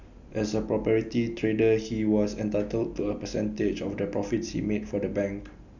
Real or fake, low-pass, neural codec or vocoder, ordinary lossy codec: real; 7.2 kHz; none; none